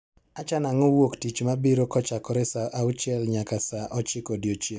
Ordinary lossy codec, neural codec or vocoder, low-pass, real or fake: none; none; none; real